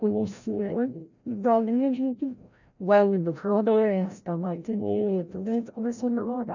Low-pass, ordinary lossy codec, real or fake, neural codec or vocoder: 7.2 kHz; none; fake; codec, 16 kHz, 0.5 kbps, FreqCodec, larger model